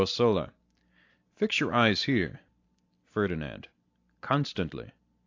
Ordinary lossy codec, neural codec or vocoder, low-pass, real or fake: AAC, 48 kbps; none; 7.2 kHz; real